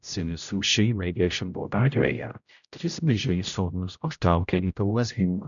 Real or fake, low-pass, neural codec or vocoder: fake; 7.2 kHz; codec, 16 kHz, 0.5 kbps, X-Codec, HuBERT features, trained on general audio